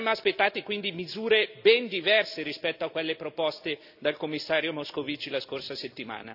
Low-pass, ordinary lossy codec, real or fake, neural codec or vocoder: 5.4 kHz; none; real; none